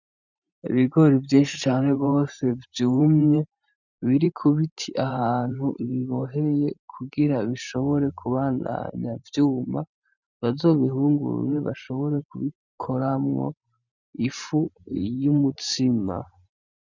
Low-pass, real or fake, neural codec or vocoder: 7.2 kHz; fake; vocoder, 44.1 kHz, 128 mel bands every 512 samples, BigVGAN v2